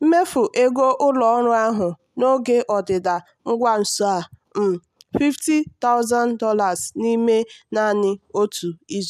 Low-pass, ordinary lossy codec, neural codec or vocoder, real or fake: 14.4 kHz; none; none; real